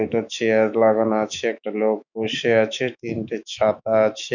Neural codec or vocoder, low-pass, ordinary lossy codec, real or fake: autoencoder, 48 kHz, 128 numbers a frame, DAC-VAE, trained on Japanese speech; 7.2 kHz; none; fake